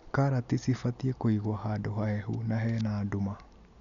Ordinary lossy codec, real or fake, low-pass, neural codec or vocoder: none; real; 7.2 kHz; none